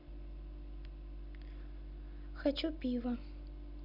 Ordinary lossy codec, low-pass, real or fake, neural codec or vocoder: none; 5.4 kHz; real; none